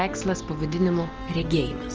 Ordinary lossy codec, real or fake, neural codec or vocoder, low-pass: Opus, 16 kbps; real; none; 7.2 kHz